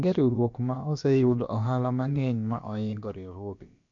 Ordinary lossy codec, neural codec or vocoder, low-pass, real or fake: MP3, 48 kbps; codec, 16 kHz, about 1 kbps, DyCAST, with the encoder's durations; 7.2 kHz; fake